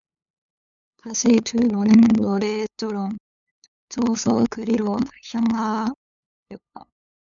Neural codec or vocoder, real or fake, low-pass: codec, 16 kHz, 8 kbps, FunCodec, trained on LibriTTS, 25 frames a second; fake; 7.2 kHz